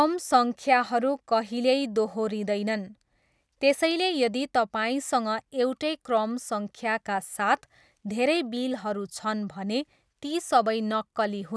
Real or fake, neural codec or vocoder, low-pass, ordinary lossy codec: real; none; none; none